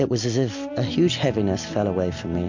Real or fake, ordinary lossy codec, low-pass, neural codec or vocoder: real; MP3, 48 kbps; 7.2 kHz; none